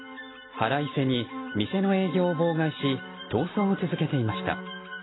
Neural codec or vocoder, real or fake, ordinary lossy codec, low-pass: none; real; AAC, 16 kbps; 7.2 kHz